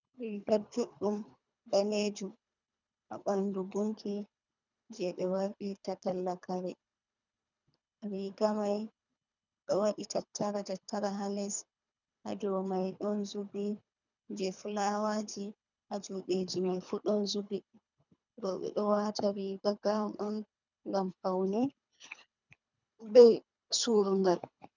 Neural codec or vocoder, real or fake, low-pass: codec, 24 kHz, 3 kbps, HILCodec; fake; 7.2 kHz